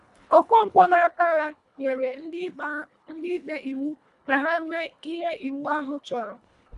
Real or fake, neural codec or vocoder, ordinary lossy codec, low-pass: fake; codec, 24 kHz, 1.5 kbps, HILCodec; none; 10.8 kHz